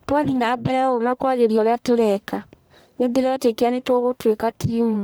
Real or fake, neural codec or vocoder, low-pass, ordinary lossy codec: fake; codec, 44.1 kHz, 1.7 kbps, Pupu-Codec; none; none